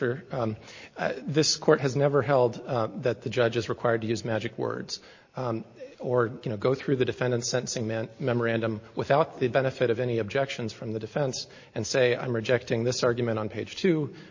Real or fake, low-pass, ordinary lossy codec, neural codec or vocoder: real; 7.2 kHz; MP3, 32 kbps; none